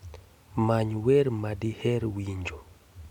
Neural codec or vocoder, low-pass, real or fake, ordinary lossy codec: none; 19.8 kHz; real; none